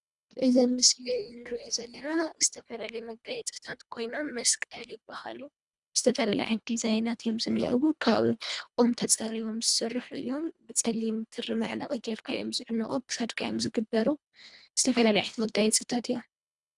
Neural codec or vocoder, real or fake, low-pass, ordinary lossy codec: codec, 24 kHz, 1.5 kbps, HILCodec; fake; 10.8 kHz; Opus, 64 kbps